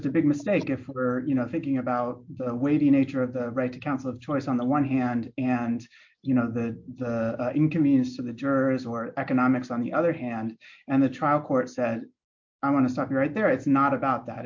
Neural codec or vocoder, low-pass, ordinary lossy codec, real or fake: none; 7.2 kHz; MP3, 48 kbps; real